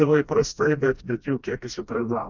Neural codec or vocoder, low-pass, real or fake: codec, 16 kHz, 1 kbps, FreqCodec, smaller model; 7.2 kHz; fake